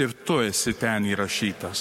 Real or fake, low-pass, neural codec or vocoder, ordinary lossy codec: fake; 19.8 kHz; codec, 44.1 kHz, 7.8 kbps, Pupu-Codec; MP3, 64 kbps